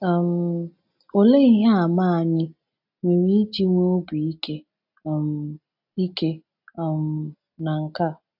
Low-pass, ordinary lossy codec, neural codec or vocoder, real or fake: 5.4 kHz; AAC, 48 kbps; none; real